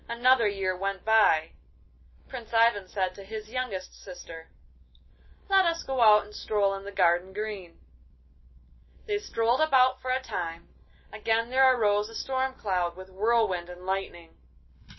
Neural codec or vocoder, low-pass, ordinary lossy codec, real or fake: none; 7.2 kHz; MP3, 24 kbps; real